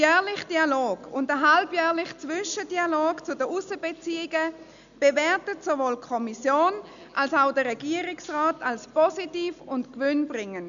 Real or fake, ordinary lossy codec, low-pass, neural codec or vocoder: real; none; 7.2 kHz; none